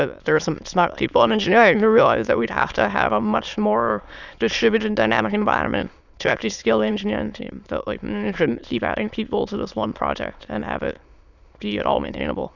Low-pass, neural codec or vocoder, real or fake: 7.2 kHz; autoencoder, 22.05 kHz, a latent of 192 numbers a frame, VITS, trained on many speakers; fake